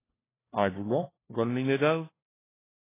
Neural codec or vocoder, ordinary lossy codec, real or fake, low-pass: codec, 16 kHz, 1 kbps, FunCodec, trained on LibriTTS, 50 frames a second; AAC, 16 kbps; fake; 3.6 kHz